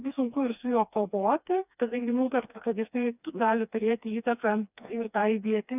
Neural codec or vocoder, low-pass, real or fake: codec, 16 kHz, 2 kbps, FreqCodec, smaller model; 3.6 kHz; fake